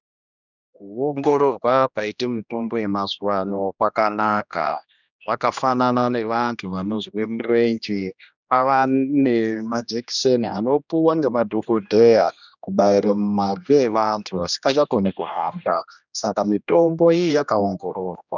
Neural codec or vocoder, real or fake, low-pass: codec, 16 kHz, 1 kbps, X-Codec, HuBERT features, trained on general audio; fake; 7.2 kHz